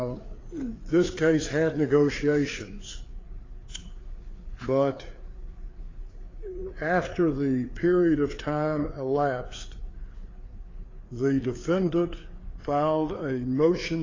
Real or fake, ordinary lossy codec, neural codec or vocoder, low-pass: fake; AAC, 32 kbps; codec, 16 kHz, 4 kbps, FreqCodec, larger model; 7.2 kHz